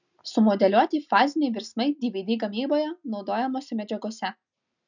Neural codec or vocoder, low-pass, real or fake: none; 7.2 kHz; real